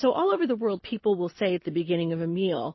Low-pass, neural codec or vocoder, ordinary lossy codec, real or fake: 7.2 kHz; none; MP3, 24 kbps; real